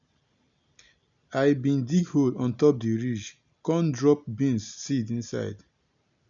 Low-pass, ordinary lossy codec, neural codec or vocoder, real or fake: 7.2 kHz; none; none; real